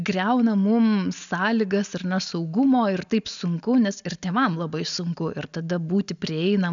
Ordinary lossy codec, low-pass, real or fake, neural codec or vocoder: MP3, 96 kbps; 7.2 kHz; real; none